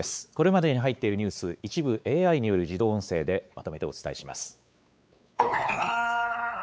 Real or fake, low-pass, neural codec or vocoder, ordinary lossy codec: fake; none; codec, 16 kHz, 4 kbps, X-Codec, WavLM features, trained on Multilingual LibriSpeech; none